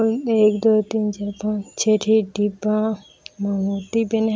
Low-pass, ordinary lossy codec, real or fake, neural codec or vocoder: none; none; real; none